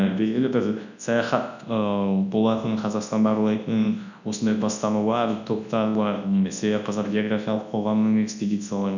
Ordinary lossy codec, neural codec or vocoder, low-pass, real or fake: none; codec, 24 kHz, 0.9 kbps, WavTokenizer, large speech release; 7.2 kHz; fake